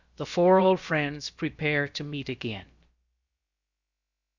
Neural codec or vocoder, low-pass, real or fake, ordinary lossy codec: codec, 16 kHz, about 1 kbps, DyCAST, with the encoder's durations; 7.2 kHz; fake; Opus, 64 kbps